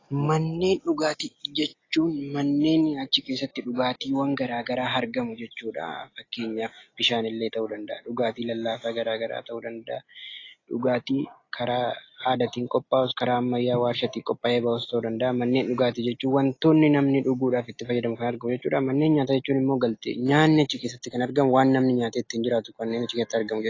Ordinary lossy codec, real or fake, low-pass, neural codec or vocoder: AAC, 32 kbps; real; 7.2 kHz; none